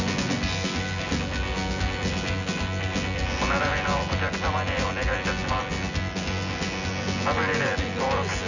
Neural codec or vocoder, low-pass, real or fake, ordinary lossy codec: vocoder, 24 kHz, 100 mel bands, Vocos; 7.2 kHz; fake; none